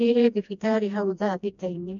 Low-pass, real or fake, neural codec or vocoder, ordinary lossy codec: 7.2 kHz; fake; codec, 16 kHz, 1 kbps, FreqCodec, smaller model; none